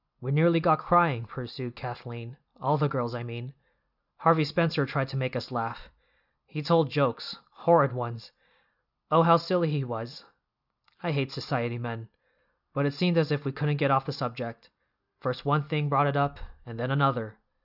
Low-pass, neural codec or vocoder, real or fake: 5.4 kHz; none; real